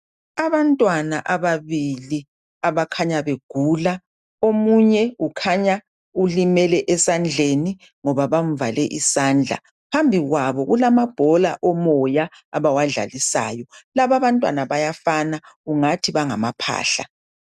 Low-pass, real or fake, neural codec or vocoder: 14.4 kHz; real; none